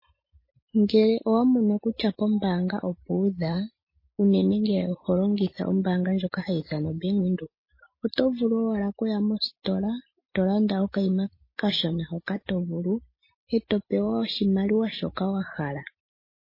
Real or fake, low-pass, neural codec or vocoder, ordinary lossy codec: real; 5.4 kHz; none; MP3, 24 kbps